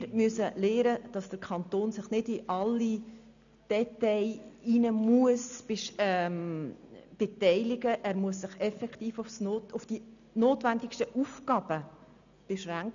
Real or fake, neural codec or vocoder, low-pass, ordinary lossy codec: real; none; 7.2 kHz; none